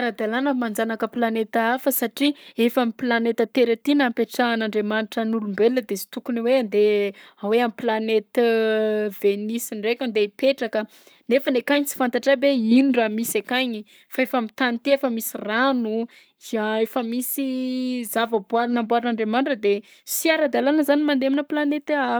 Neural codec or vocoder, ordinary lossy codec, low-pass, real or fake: codec, 44.1 kHz, 7.8 kbps, Pupu-Codec; none; none; fake